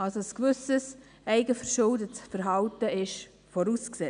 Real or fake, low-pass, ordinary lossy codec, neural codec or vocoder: real; 9.9 kHz; none; none